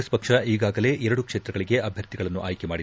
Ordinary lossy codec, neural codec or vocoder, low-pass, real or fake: none; none; none; real